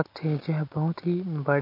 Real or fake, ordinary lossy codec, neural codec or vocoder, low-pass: real; MP3, 32 kbps; none; 5.4 kHz